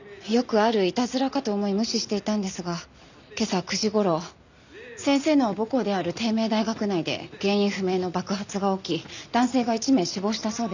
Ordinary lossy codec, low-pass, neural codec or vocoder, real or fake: none; 7.2 kHz; none; real